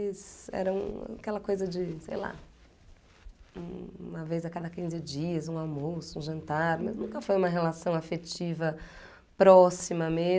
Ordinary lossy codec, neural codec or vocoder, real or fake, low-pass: none; none; real; none